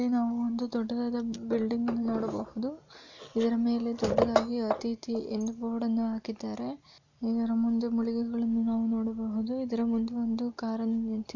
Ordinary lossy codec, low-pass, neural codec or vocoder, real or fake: none; 7.2 kHz; none; real